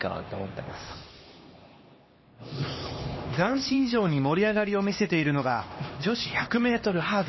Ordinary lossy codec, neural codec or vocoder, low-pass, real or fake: MP3, 24 kbps; codec, 16 kHz, 2 kbps, X-Codec, HuBERT features, trained on LibriSpeech; 7.2 kHz; fake